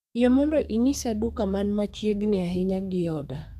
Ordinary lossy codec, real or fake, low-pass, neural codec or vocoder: none; fake; 14.4 kHz; codec, 32 kHz, 1.9 kbps, SNAC